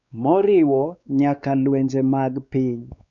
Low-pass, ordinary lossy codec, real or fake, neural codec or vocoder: 7.2 kHz; none; fake; codec, 16 kHz, 4 kbps, X-Codec, WavLM features, trained on Multilingual LibriSpeech